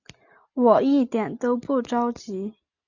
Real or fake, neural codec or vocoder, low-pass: real; none; 7.2 kHz